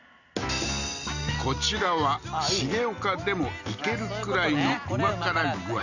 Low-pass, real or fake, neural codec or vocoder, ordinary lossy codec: 7.2 kHz; real; none; none